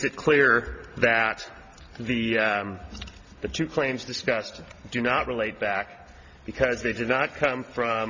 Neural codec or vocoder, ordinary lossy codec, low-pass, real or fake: none; Opus, 64 kbps; 7.2 kHz; real